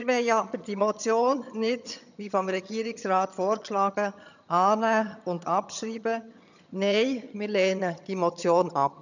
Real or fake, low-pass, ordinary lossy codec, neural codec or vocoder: fake; 7.2 kHz; none; vocoder, 22.05 kHz, 80 mel bands, HiFi-GAN